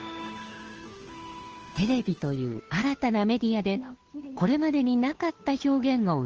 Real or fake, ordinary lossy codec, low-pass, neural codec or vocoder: fake; Opus, 16 kbps; 7.2 kHz; codec, 16 kHz, 2 kbps, FunCodec, trained on Chinese and English, 25 frames a second